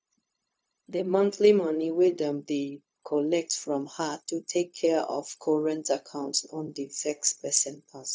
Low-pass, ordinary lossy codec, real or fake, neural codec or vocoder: none; none; fake; codec, 16 kHz, 0.4 kbps, LongCat-Audio-Codec